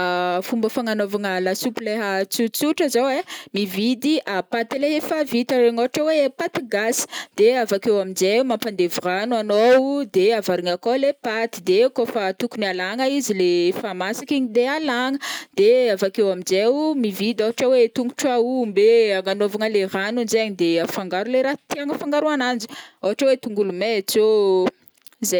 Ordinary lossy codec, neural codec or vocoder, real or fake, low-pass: none; none; real; none